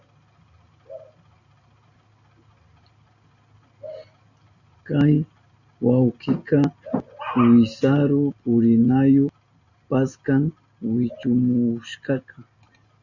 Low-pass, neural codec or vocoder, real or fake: 7.2 kHz; none; real